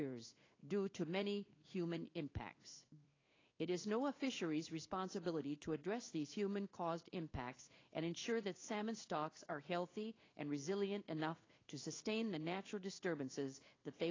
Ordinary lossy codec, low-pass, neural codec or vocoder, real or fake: AAC, 32 kbps; 7.2 kHz; codec, 16 kHz in and 24 kHz out, 1 kbps, XY-Tokenizer; fake